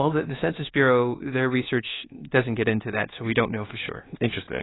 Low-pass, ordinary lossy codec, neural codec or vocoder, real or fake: 7.2 kHz; AAC, 16 kbps; codec, 24 kHz, 1.2 kbps, DualCodec; fake